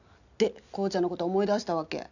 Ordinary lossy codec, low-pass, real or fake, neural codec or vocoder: none; 7.2 kHz; real; none